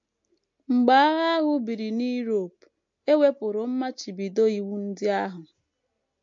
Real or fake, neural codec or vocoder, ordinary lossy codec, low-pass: real; none; MP3, 48 kbps; 7.2 kHz